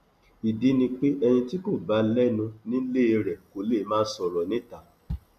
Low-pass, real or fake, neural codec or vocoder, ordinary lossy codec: 14.4 kHz; real; none; none